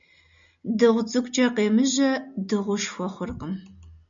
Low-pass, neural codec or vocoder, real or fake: 7.2 kHz; none; real